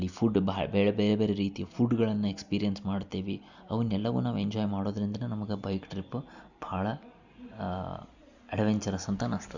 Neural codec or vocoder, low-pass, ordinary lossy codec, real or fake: none; 7.2 kHz; none; real